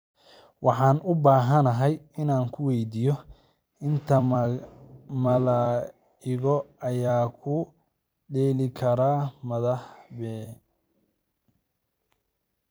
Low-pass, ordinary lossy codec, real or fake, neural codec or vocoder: none; none; fake; vocoder, 44.1 kHz, 128 mel bands every 256 samples, BigVGAN v2